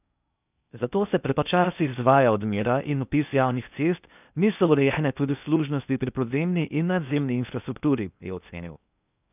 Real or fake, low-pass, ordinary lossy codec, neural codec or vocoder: fake; 3.6 kHz; none; codec, 16 kHz in and 24 kHz out, 0.6 kbps, FocalCodec, streaming, 4096 codes